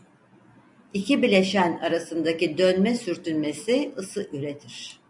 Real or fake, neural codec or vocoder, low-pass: real; none; 10.8 kHz